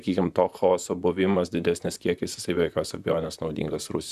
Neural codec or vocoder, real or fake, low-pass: vocoder, 44.1 kHz, 128 mel bands, Pupu-Vocoder; fake; 14.4 kHz